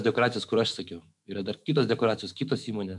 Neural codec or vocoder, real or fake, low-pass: autoencoder, 48 kHz, 128 numbers a frame, DAC-VAE, trained on Japanese speech; fake; 10.8 kHz